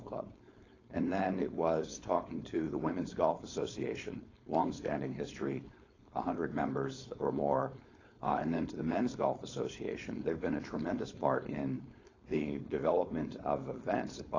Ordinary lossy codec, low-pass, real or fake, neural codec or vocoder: AAC, 32 kbps; 7.2 kHz; fake; codec, 16 kHz, 4.8 kbps, FACodec